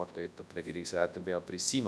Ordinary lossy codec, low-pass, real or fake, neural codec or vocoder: none; none; fake; codec, 24 kHz, 0.9 kbps, WavTokenizer, large speech release